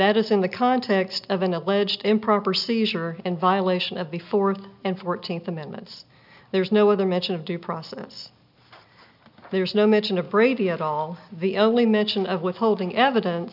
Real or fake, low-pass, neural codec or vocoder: real; 5.4 kHz; none